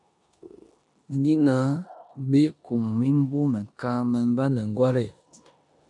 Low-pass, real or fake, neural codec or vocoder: 10.8 kHz; fake; codec, 16 kHz in and 24 kHz out, 0.9 kbps, LongCat-Audio-Codec, four codebook decoder